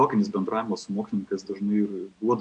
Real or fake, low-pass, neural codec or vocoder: real; 9.9 kHz; none